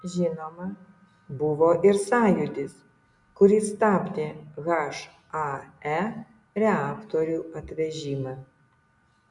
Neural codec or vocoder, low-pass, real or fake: none; 10.8 kHz; real